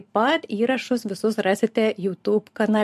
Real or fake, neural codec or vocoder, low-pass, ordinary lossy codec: real; none; 14.4 kHz; MP3, 64 kbps